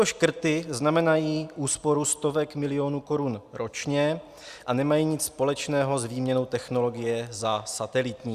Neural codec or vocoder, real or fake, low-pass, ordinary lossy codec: none; real; 14.4 kHz; Opus, 64 kbps